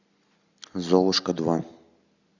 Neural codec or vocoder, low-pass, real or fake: none; 7.2 kHz; real